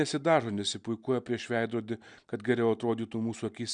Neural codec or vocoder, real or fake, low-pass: none; real; 9.9 kHz